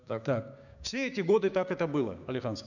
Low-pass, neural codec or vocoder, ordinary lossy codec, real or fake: 7.2 kHz; codec, 16 kHz, 6 kbps, DAC; none; fake